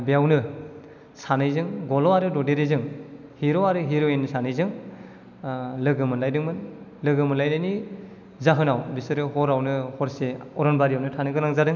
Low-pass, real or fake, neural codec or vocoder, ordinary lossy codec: 7.2 kHz; real; none; none